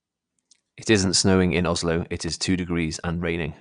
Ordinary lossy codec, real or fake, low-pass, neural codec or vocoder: none; fake; 9.9 kHz; vocoder, 22.05 kHz, 80 mel bands, Vocos